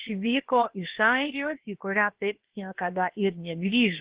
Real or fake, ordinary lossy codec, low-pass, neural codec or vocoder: fake; Opus, 16 kbps; 3.6 kHz; codec, 16 kHz, about 1 kbps, DyCAST, with the encoder's durations